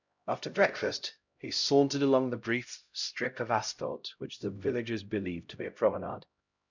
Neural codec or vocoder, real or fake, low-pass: codec, 16 kHz, 0.5 kbps, X-Codec, HuBERT features, trained on LibriSpeech; fake; 7.2 kHz